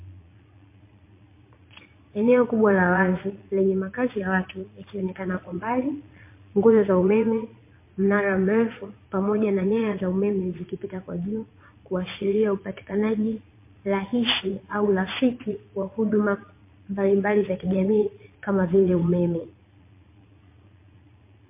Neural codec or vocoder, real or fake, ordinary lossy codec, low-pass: vocoder, 22.05 kHz, 80 mel bands, Vocos; fake; MP3, 24 kbps; 3.6 kHz